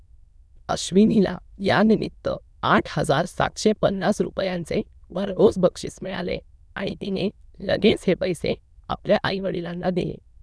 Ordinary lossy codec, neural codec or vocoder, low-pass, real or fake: none; autoencoder, 22.05 kHz, a latent of 192 numbers a frame, VITS, trained on many speakers; none; fake